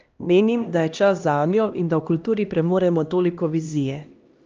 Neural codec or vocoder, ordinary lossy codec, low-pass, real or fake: codec, 16 kHz, 1 kbps, X-Codec, HuBERT features, trained on LibriSpeech; Opus, 24 kbps; 7.2 kHz; fake